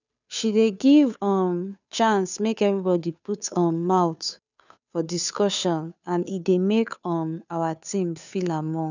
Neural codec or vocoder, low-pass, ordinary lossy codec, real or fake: codec, 16 kHz, 2 kbps, FunCodec, trained on Chinese and English, 25 frames a second; 7.2 kHz; none; fake